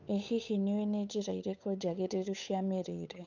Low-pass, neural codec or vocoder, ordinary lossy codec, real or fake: 7.2 kHz; codec, 16 kHz, 8 kbps, FunCodec, trained on Chinese and English, 25 frames a second; none; fake